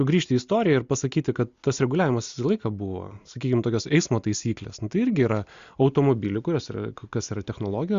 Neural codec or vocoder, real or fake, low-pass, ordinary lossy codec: none; real; 7.2 kHz; Opus, 64 kbps